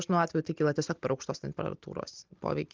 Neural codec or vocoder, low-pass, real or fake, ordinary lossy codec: none; 7.2 kHz; real; Opus, 32 kbps